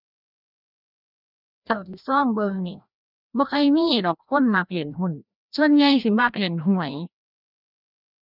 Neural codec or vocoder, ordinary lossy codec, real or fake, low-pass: codec, 16 kHz, 1 kbps, FreqCodec, larger model; none; fake; 5.4 kHz